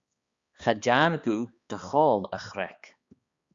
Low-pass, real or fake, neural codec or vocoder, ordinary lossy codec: 7.2 kHz; fake; codec, 16 kHz, 2 kbps, X-Codec, HuBERT features, trained on balanced general audio; Opus, 64 kbps